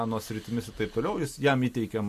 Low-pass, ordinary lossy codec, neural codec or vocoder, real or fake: 14.4 kHz; MP3, 64 kbps; none; real